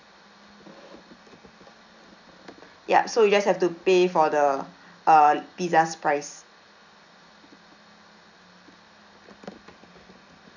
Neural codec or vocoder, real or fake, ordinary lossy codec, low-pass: none; real; none; 7.2 kHz